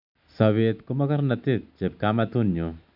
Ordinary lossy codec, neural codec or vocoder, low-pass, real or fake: none; none; 5.4 kHz; real